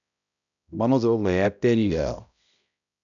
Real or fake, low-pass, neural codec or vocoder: fake; 7.2 kHz; codec, 16 kHz, 0.5 kbps, X-Codec, HuBERT features, trained on balanced general audio